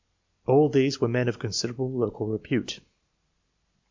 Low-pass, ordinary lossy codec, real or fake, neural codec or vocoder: 7.2 kHz; MP3, 64 kbps; real; none